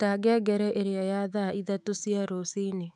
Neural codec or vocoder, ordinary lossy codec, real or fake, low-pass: autoencoder, 48 kHz, 128 numbers a frame, DAC-VAE, trained on Japanese speech; none; fake; 10.8 kHz